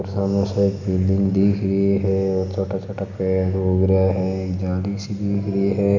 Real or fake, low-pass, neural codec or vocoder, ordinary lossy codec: real; 7.2 kHz; none; none